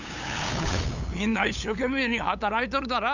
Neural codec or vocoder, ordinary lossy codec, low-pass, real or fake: codec, 16 kHz, 8 kbps, FunCodec, trained on LibriTTS, 25 frames a second; none; 7.2 kHz; fake